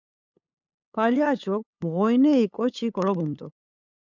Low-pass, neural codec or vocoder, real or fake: 7.2 kHz; codec, 16 kHz, 8 kbps, FunCodec, trained on LibriTTS, 25 frames a second; fake